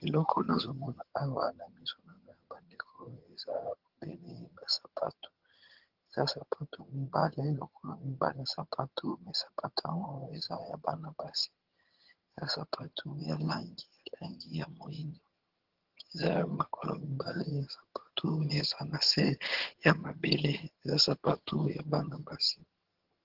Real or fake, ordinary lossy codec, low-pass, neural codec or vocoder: fake; Opus, 16 kbps; 5.4 kHz; vocoder, 22.05 kHz, 80 mel bands, HiFi-GAN